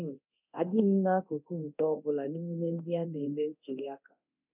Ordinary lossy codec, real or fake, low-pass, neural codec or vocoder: none; fake; 3.6 kHz; codec, 24 kHz, 0.9 kbps, DualCodec